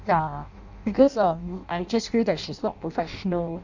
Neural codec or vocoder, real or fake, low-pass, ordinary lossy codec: codec, 16 kHz in and 24 kHz out, 0.6 kbps, FireRedTTS-2 codec; fake; 7.2 kHz; none